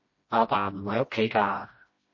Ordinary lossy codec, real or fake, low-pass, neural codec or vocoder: MP3, 32 kbps; fake; 7.2 kHz; codec, 16 kHz, 1 kbps, FreqCodec, smaller model